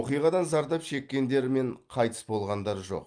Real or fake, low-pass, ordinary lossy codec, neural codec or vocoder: real; 9.9 kHz; Opus, 32 kbps; none